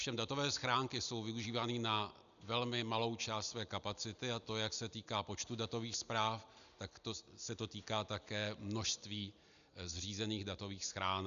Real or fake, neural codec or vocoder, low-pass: real; none; 7.2 kHz